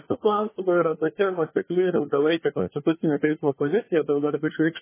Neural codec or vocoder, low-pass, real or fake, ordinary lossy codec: codec, 24 kHz, 1 kbps, SNAC; 3.6 kHz; fake; MP3, 16 kbps